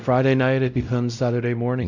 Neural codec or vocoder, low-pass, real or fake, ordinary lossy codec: codec, 16 kHz, 0.5 kbps, X-Codec, WavLM features, trained on Multilingual LibriSpeech; 7.2 kHz; fake; Opus, 64 kbps